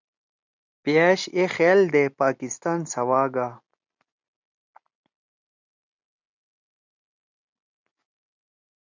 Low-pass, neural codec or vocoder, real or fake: 7.2 kHz; none; real